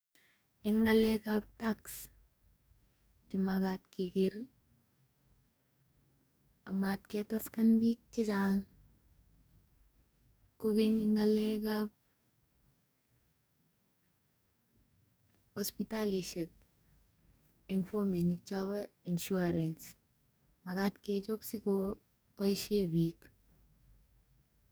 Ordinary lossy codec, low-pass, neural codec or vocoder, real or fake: none; none; codec, 44.1 kHz, 2.6 kbps, DAC; fake